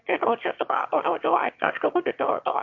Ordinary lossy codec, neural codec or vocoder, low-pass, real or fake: MP3, 48 kbps; autoencoder, 22.05 kHz, a latent of 192 numbers a frame, VITS, trained on one speaker; 7.2 kHz; fake